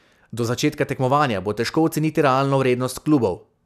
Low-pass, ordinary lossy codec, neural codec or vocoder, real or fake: 14.4 kHz; none; none; real